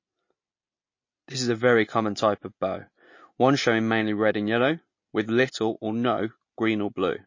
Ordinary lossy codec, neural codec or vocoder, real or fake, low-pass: MP3, 32 kbps; none; real; 7.2 kHz